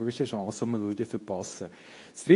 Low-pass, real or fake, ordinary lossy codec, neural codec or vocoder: 10.8 kHz; fake; AAC, 48 kbps; codec, 24 kHz, 0.9 kbps, WavTokenizer, medium speech release version 2